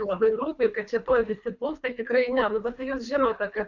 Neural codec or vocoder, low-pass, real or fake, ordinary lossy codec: codec, 24 kHz, 3 kbps, HILCodec; 7.2 kHz; fake; MP3, 64 kbps